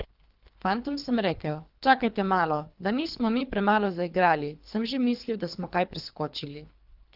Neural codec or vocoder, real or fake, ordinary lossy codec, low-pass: codec, 24 kHz, 3 kbps, HILCodec; fake; Opus, 24 kbps; 5.4 kHz